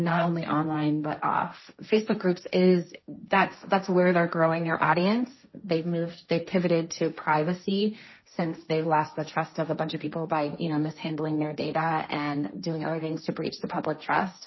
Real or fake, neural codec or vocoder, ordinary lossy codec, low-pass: fake; codec, 16 kHz, 1.1 kbps, Voila-Tokenizer; MP3, 24 kbps; 7.2 kHz